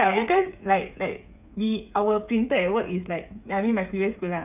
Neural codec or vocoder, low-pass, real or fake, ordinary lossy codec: codec, 16 kHz, 8 kbps, FreqCodec, smaller model; 3.6 kHz; fake; none